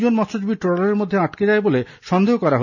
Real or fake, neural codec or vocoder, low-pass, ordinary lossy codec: real; none; 7.2 kHz; none